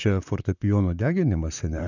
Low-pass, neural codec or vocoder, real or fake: 7.2 kHz; vocoder, 44.1 kHz, 128 mel bands, Pupu-Vocoder; fake